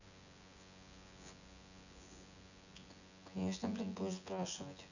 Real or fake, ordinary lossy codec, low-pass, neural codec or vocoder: fake; none; 7.2 kHz; vocoder, 24 kHz, 100 mel bands, Vocos